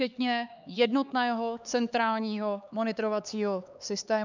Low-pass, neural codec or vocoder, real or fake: 7.2 kHz; codec, 16 kHz, 4 kbps, X-Codec, HuBERT features, trained on LibriSpeech; fake